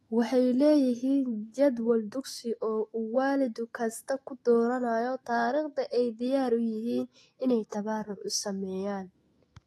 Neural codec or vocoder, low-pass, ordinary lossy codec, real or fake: autoencoder, 48 kHz, 128 numbers a frame, DAC-VAE, trained on Japanese speech; 19.8 kHz; AAC, 32 kbps; fake